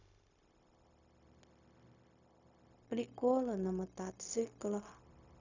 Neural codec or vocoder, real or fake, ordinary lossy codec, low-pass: codec, 16 kHz, 0.4 kbps, LongCat-Audio-Codec; fake; none; 7.2 kHz